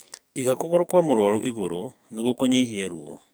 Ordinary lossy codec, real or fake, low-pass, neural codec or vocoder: none; fake; none; codec, 44.1 kHz, 2.6 kbps, SNAC